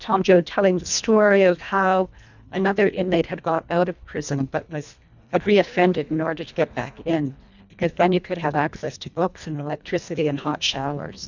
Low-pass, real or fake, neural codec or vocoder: 7.2 kHz; fake; codec, 24 kHz, 1.5 kbps, HILCodec